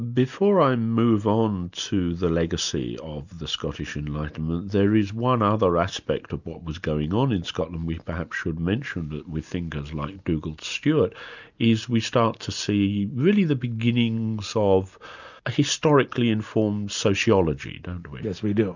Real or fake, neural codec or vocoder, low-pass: real; none; 7.2 kHz